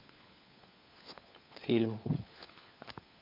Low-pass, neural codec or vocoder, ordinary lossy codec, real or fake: 5.4 kHz; codec, 16 kHz, 4 kbps, FunCodec, trained on LibriTTS, 50 frames a second; MP3, 48 kbps; fake